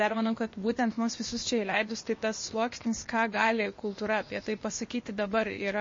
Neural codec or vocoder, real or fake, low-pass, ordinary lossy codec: codec, 16 kHz, 0.8 kbps, ZipCodec; fake; 7.2 kHz; MP3, 32 kbps